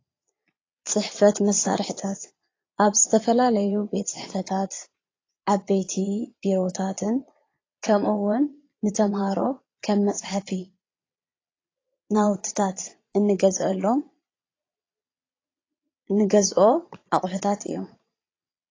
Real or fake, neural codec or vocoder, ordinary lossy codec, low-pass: fake; vocoder, 22.05 kHz, 80 mel bands, Vocos; AAC, 32 kbps; 7.2 kHz